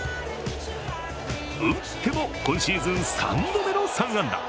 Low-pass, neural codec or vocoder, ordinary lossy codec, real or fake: none; none; none; real